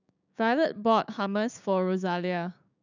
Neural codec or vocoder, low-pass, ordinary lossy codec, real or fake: codec, 16 kHz, 6 kbps, DAC; 7.2 kHz; none; fake